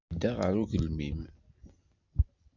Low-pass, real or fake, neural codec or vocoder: 7.2 kHz; real; none